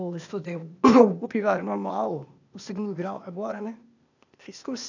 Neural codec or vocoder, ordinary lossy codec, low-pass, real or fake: codec, 16 kHz, 0.8 kbps, ZipCodec; none; 7.2 kHz; fake